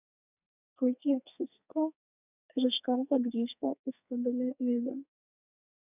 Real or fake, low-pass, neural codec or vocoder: fake; 3.6 kHz; codec, 32 kHz, 1.9 kbps, SNAC